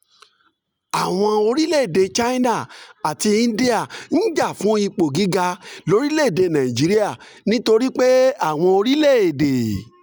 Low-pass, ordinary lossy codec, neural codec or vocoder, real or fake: none; none; none; real